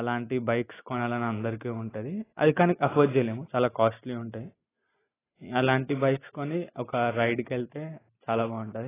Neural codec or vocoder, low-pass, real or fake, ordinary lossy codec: none; 3.6 kHz; real; AAC, 16 kbps